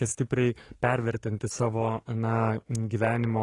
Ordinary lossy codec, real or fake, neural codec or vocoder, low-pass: AAC, 32 kbps; fake; codec, 44.1 kHz, 7.8 kbps, DAC; 10.8 kHz